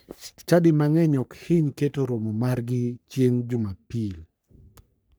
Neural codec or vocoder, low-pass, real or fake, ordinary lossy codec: codec, 44.1 kHz, 3.4 kbps, Pupu-Codec; none; fake; none